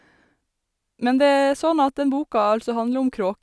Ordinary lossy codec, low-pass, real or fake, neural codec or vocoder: none; none; real; none